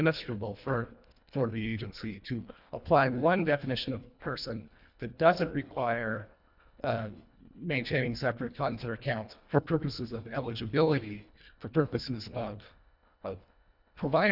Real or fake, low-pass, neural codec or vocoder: fake; 5.4 kHz; codec, 24 kHz, 1.5 kbps, HILCodec